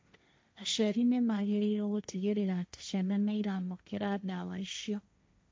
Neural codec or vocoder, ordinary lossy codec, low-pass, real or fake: codec, 16 kHz, 1.1 kbps, Voila-Tokenizer; none; none; fake